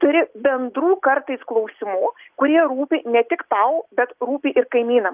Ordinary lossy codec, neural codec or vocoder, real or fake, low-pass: Opus, 32 kbps; none; real; 3.6 kHz